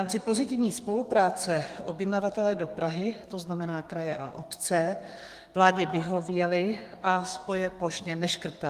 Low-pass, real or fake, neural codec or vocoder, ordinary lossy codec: 14.4 kHz; fake; codec, 32 kHz, 1.9 kbps, SNAC; Opus, 32 kbps